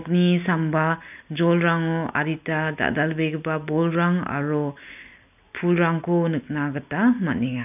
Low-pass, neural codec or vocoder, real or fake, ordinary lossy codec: 3.6 kHz; none; real; none